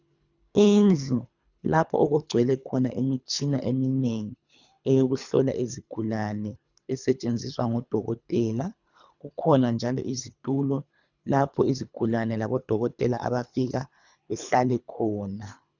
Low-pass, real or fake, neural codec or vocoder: 7.2 kHz; fake; codec, 24 kHz, 3 kbps, HILCodec